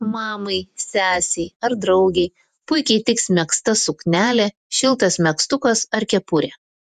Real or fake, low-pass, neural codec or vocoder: fake; 14.4 kHz; vocoder, 48 kHz, 128 mel bands, Vocos